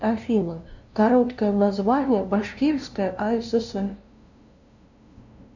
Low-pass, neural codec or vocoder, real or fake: 7.2 kHz; codec, 16 kHz, 0.5 kbps, FunCodec, trained on LibriTTS, 25 frames a second; fake